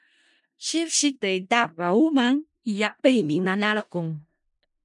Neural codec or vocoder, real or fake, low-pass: codec, 16 kHz in and 24 kHz out, 0.4 kbps, LongCat-Audio-Codec, four codebook decoder; fake; 10.8 kHz